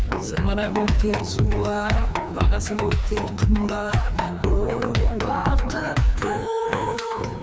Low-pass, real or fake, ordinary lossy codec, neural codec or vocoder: none; fake; none; codec, 16 kHz, 2 kbps, FreqCodec, larger model